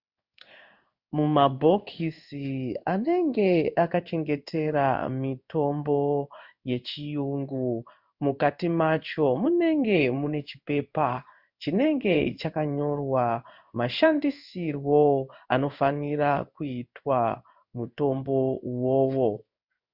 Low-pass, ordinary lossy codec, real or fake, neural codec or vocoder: 5.4 kHz; Opus, 64 kbps; fake; codec, 16 kHz in and 24 kHz out, 1 kbps, XY-Tokenizer